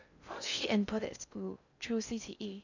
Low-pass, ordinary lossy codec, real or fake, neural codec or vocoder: 7.2 kHz; none; fake; codec, 16 kHz in and 24 kHz out, 0.6 kbps, FocalCodec, streaming, 2048 codes